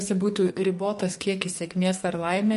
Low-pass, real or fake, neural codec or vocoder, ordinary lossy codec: 14.4 kHz; fake; codec, 44.1 kHz, 2.6 kbps, SNAC; MP3, 48 kbps